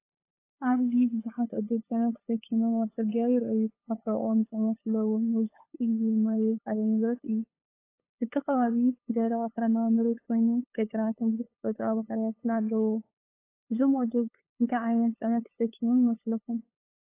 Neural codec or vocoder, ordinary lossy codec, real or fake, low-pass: codec, 16 kHz, 8 kbps, FunCodec, trained on LibriTTS, 25 frames a second; AAC, 24 kbps; fake; 3.6 kHz